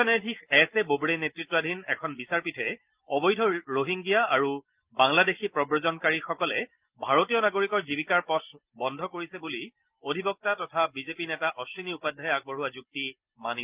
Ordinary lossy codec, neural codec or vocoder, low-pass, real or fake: Opus, 32 kbps; none; 3.6 kHz; real